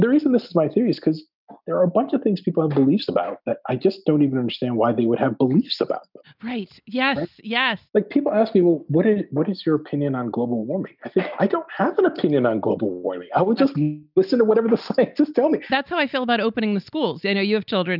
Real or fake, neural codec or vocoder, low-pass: real; none; 5.4 kHz